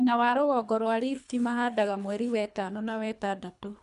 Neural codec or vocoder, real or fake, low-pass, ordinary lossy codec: codec, 24 kHz, 3 kbps, HILCodec; fake; 10.8 kHz; none